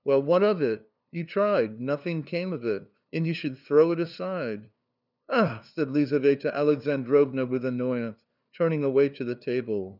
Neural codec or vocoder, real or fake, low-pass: codec, 16 kHz, 2 kbps, FunCodec, trained on LibriTTS, 25 frames a second; fake; 5.4 kHz